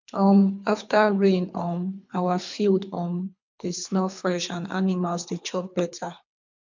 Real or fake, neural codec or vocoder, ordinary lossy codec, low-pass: fake; codec, 24 kHz, 3 kbps, HILCodec; MP3, 64 kbps; 7.2 kHz